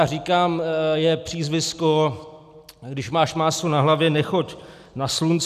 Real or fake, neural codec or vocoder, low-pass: real; none; 14.4 kHz